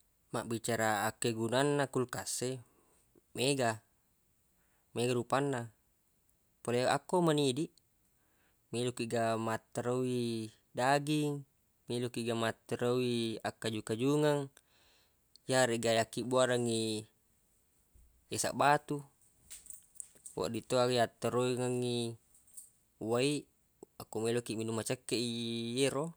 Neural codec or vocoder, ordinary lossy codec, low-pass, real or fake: none; none; none; real